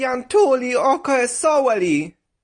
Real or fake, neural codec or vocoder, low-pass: real; none; 9.9 kHz